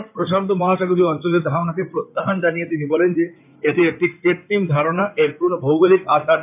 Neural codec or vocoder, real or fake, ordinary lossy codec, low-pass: codec, 16 kHz in and 24 kHz out, 2.2 kbps, FireRedTTS-2 codec; fake; none; 3.6 kHz